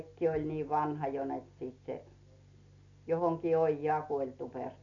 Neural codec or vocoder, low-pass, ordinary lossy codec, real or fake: none; 7.2 kHz; none; real